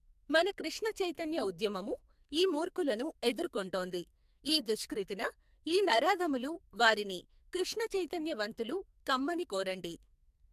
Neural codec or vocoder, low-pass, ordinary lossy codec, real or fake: codec, 44.1 kHz, 2.6 kbps, SNAC; 14.4 kHz; MP3, 96 kbps; fake